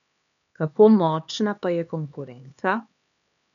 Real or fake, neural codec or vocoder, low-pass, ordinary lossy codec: fake; codec, 16 kHz, 2 kbps, X-Codec, HuBERT features, trained on LibriSpeech; 7.2 kHz; none